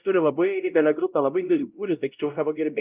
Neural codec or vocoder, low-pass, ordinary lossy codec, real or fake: codec, 16 kHz, 0.5 kbps, X-Codec, WavLM features, trained on Multilingual LibriSpeech; 3.6 kHz; Opus, 24 kbps; fake